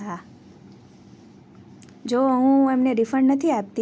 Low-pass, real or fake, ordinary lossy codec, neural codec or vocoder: none; real; none; none